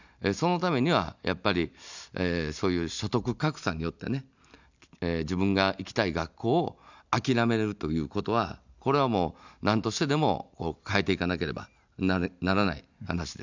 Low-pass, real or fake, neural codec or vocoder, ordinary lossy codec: 7.2 kHz; real; none; none